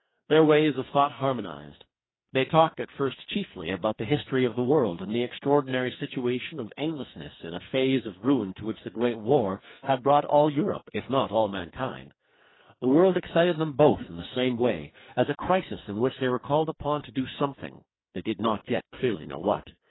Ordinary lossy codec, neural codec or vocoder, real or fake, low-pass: AAC, 16 kbps; codec, 44.1 kHz, 2.6 kbps, SNAC; fake; 7.2 kHz